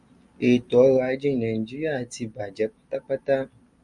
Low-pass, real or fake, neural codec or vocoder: 10.8 kHz; real; none